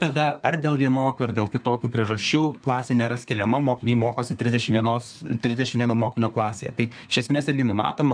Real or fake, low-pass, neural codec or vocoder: fake; 9.9 kHz; codec, 24 kHz, 1 kbps, SNAC